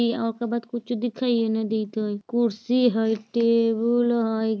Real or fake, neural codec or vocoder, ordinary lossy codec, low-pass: real; none; none; none